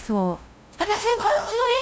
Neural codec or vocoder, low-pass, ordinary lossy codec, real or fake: codec, 16 kHz, 0.5 kbps, FunCodec, trained on LibriTTS, 25 frames a second; none; none; fake